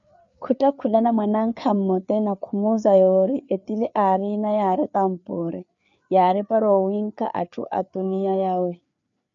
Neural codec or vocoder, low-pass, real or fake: codec, 16 kHz, 4 kbps, FreqCodec, larger model; 7.2 kHz; fake